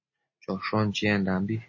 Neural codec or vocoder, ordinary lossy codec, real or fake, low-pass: none; MP3, 32 kbps; real; 7.2 kHz